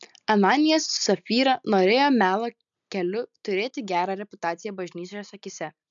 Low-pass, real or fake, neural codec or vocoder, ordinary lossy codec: 7.2 kHz; real; none; MP3, 96 kbps